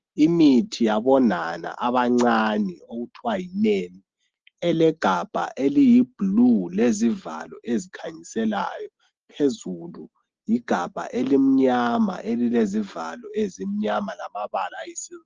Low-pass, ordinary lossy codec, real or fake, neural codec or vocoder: 7.2 kHz; Opus, 16 kbps; real; none